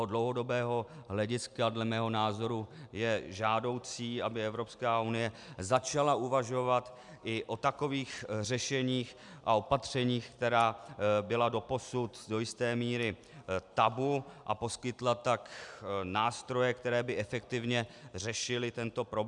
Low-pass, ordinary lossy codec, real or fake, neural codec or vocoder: 10.8 kHz; MP3, 96 kbps; real; none